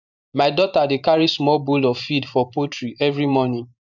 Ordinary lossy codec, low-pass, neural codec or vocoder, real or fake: none; 7.2 kHz; vocoder, 44.1 kHz, 128 mel bands every 512 samples, BigVGAN v2; fake